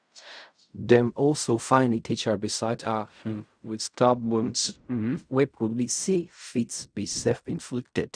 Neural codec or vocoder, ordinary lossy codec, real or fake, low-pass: codec, 16 kHz in and 24 kHz out, 0.4 kbps, LongCat-Audio-Codec, fine tuned four codebook decoder; none; fake; 9.9 kHz